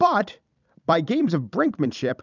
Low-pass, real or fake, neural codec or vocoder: 7.2 kHz; fake; vocoder, 44.1 kHz, 128 mel bands every 256 samples, BigVGAN v2